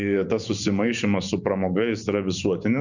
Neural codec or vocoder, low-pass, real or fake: vocoder, 24 kHz, 100 mel bands, Vocos; 7.2 kHz; fake